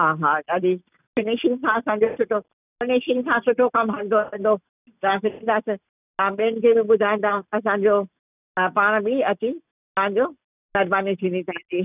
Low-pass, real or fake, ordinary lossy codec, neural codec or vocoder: 3.6 kHz; real; none; none